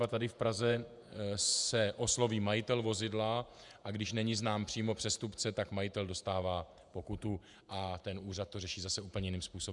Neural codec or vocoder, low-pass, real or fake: vocoder, 48 kHz, 128 mel bands, Vocos; 10.8 kHz; fake